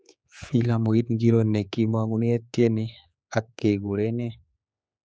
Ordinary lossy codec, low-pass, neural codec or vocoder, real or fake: none; none; codec, 16 kHz, 4 kbps, X-Codec, HuBERT features, trained on general audio; fake